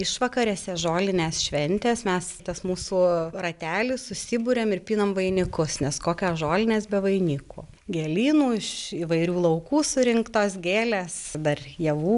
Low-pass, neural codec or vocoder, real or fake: 10.8 kHz; none; real